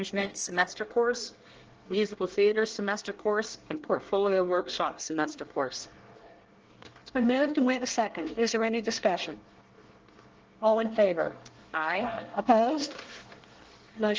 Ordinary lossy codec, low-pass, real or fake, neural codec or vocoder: Opus, 16 kbps; 7.2 kHz; fake; codec, 24 kHz, 1 kbps, SNAC